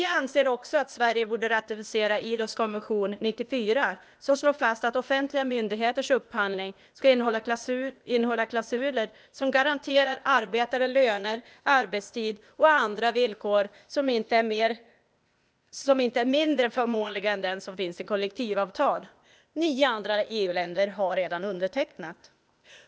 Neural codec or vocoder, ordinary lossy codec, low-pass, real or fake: codec, 16 kHz, 0.8 kbps, ZipCodec; none; none; fake